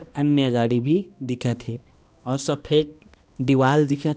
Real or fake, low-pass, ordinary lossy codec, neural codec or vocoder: fake; none; none; codec, 16 kHz, 1 kbps, X-Codec, HuBERT features, trained on balanced general audio